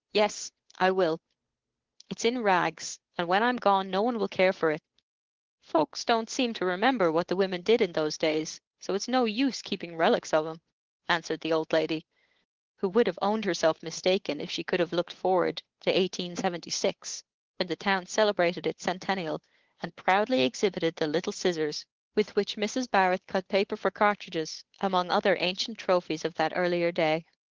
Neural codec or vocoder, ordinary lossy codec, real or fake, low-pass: codec, 16 kHz, 8 kbps, FunCodec, trained on Chinese and English, 25 frames a second; Opus, 16 kbps; fake; 7.2 kHz